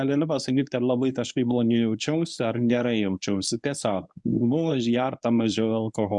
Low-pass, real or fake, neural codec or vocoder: 10.8 kHz; fake; codec, 24 kHz, 0.9 kbps, WavTokenizer, medium speech release version 1